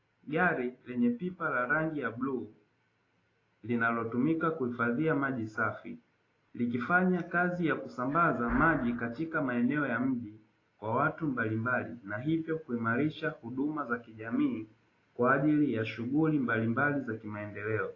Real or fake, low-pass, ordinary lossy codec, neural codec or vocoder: real; 7.2 kHz; AAC, 32 kbps; none